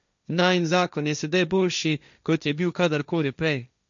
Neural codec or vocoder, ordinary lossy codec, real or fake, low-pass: codec, 16 kHz, 1.1 kbps, Voila-Tokenizer; none; fake; 7.2 kHz